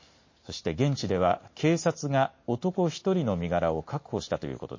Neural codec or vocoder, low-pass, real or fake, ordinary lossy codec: none; 7.2 kHz; real; MP3, 32 kbps